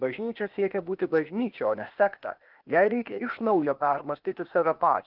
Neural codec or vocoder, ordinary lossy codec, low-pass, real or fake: codec, 16 kHz, 0.8 kbps, ZipCodec; Opus, 32 kbps; 5.4 kHz; fake